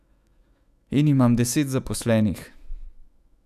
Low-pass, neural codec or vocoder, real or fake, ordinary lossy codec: 14.4 kHz; autoencoder, 48 kHz, 128 numbers a frame, DAC-VAE, trained on Japanese speech; fake; none